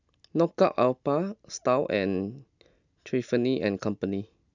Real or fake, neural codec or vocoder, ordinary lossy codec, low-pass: real; none; none; 7.2 kHz